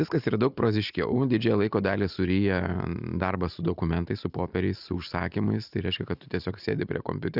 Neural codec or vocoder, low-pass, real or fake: none; 5.4 kHz; real